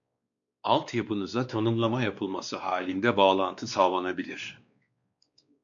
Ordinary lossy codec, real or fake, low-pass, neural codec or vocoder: MP3, 96 kbps; fake; 7.2 kHz; codec, 16 kHz, 2 kbps, X-Codec, WavLM features, trained on Multilingual LibriSpeech